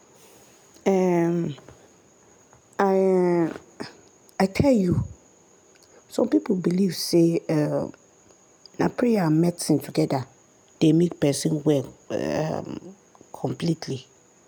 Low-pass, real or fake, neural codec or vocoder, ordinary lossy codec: 19.8 kHz; real; none; none